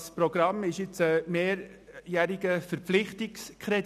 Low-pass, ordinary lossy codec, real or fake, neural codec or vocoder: 14.4 kHz; none; real; none